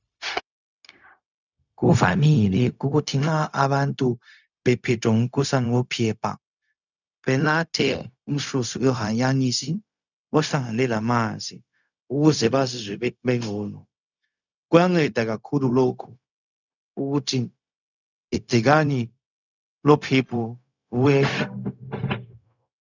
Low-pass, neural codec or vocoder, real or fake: 7.2 kHz; codec, 16 kHz, 0.4 kbps, LongCat-Audio-Codec; fake